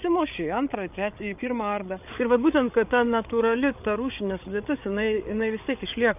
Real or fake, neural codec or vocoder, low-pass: fake; codec, 16 kHz, 8 kbps, FreqCodec, larger model; 3.6 kHz